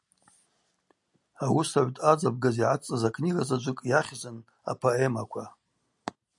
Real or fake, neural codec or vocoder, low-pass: real; none; 10.8 kHz